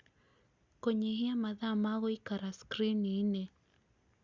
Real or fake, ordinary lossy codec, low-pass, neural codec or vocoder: real; Opus, 64 kbps; 7.2 kHz; none